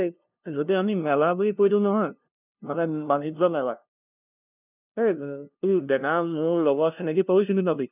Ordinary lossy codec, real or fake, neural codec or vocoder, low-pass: none; fake; codec, 16 kHz, 0.5 kbps, FunCodec, trained on LibriTTS, 25 frames a second; 3.6 kHz